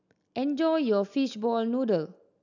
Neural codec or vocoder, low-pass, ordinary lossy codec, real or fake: none; 7.2 kHz; none; real